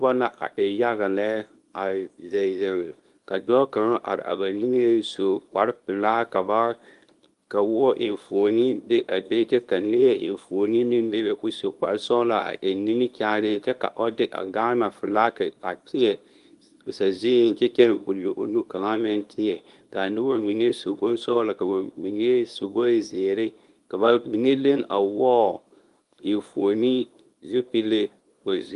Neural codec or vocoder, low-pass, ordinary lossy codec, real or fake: codec, 24 kHz, 0.9 kbps, WavTokenizer, small release; 10.8 kHz; Opus, 32 kbps; fake